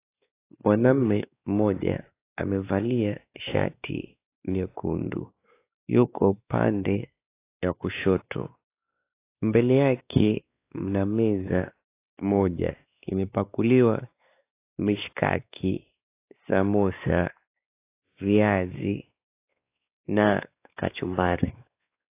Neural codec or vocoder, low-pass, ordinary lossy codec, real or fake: codec, 16 kHz, 2 kbps, X-Codec, WavLM features, trained on Multilingual LibriSpeech; 3.6 kHz; AAC, 24 kbps; fake